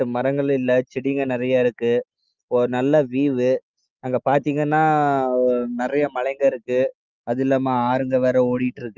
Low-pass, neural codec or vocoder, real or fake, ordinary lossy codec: 7.2 kHz; none; real; Opus, 32 kbps